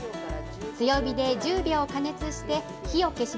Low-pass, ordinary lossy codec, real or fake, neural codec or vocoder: none; none; real; none